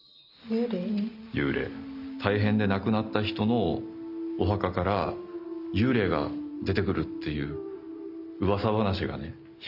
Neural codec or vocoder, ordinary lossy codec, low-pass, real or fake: none; none; 5.4 kHz; real